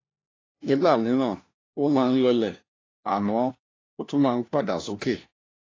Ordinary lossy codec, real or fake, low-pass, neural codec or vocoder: AAC, 32 kbps; fake; 7.2 kHz; codec, 16 kHz, 1 kbps, FunCodec, trained on LibriTTS, 50 frames a second